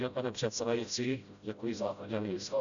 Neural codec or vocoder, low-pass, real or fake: codec, 16 kHz, 0.5 kbps, FreqCodec, smaller model; 7.2 kHz; fake